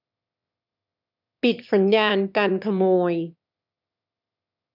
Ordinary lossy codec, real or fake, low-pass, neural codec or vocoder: none; fake; 5.4 kHz; autoencoder, 22.05 kHz, a latent of 192 numbers a frame, VITS, trained on one speaker